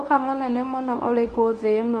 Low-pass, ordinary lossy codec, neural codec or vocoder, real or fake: 10.8 kHz; Opus, 32 kbps; codec, 24 kHz, 0.9 kbps, WavTokenizer, medium speech release version 1; fake